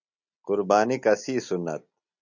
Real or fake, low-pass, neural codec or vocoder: real; 7.2 kHz; none